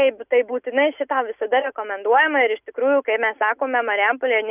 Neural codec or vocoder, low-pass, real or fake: none; 3.6 kHz; real